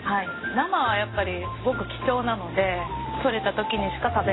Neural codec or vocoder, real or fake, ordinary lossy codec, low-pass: none; real; AAC, 16 kbps; 7.2 kHz